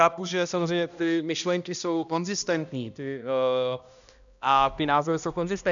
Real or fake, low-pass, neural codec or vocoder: fake; 7.2 kHz; codec, 16 kHz, 1 kbps, X-Codec, HuBERT features, trained on balanced general audio